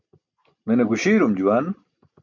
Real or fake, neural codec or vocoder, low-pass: real; none; 7.2 kHz